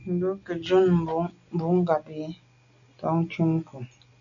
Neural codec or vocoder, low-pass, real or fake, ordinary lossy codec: none; 7.2 kHz; real; MP3, 96 kbps